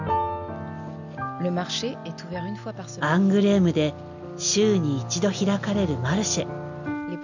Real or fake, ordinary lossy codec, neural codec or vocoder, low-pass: real; none; none; 7.2 kHz